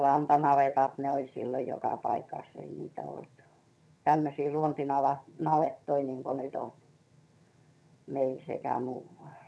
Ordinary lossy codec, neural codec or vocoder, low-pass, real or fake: none; vocoder, 22.05 kHz, 80 mel bands, HiFi-GAN; none; fake